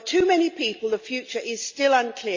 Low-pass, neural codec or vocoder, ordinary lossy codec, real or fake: 7.2 kHz; none; MP3, 48 kbps; real